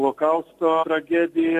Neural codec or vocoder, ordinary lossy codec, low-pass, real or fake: none; AAC, 64 kbps; 14.4 kHz; real